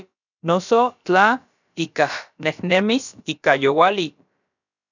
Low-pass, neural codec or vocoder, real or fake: 7.2 kHz; codec, 16 kHz, about 1 kbps, DyCAST, with the encoder's durations; fake